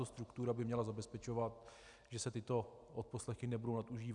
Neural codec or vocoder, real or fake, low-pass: none; real; 10.8 kHz